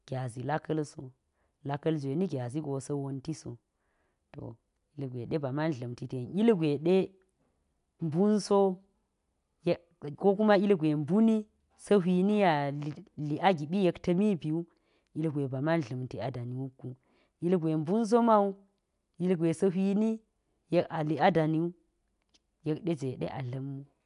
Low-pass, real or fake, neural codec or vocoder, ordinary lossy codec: 10.8 kHz; real; none; none